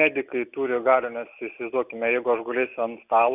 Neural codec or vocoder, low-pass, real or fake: none; 3.6 kHz; real